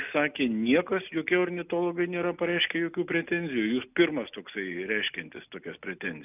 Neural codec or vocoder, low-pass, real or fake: none; 3.6 kHz; real